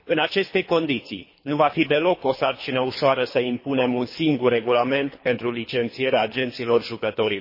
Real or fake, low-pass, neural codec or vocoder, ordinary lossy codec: fake; 5.4 kHz; codec, 24 kHz, 3 kbps, HILCodec; MP3, 24 kbps